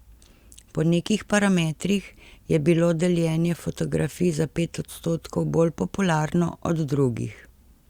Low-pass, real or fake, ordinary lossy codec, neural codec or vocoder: 19.8 kHz; real; Opus, 64 kbps; none